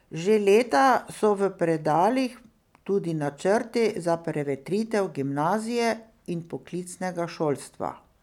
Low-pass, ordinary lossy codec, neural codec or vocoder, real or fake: 19.8 kHz; none; none; real